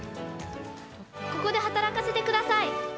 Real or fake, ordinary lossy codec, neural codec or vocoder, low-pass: real; none; none; none